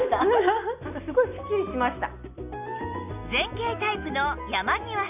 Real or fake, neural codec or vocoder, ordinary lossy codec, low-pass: real; none; none; 3.6 kHz